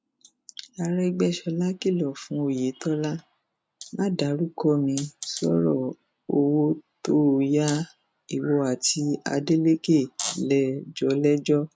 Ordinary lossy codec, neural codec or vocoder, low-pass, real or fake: none; none; none; real